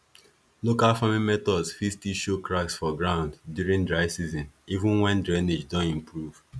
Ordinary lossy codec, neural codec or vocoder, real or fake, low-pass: none; none; real; none